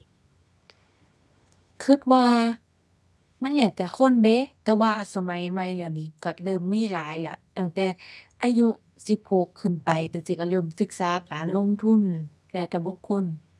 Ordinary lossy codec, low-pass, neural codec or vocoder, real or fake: none; none; codec, 24 kHz, 0.9 kbps, WavTokenizer, medium music audio release; fake